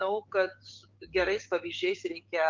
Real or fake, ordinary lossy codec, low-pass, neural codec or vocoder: fake; Opus, 24 kbps; 7.2 kHz; codec, 16 kHz, 6 kbps, DAC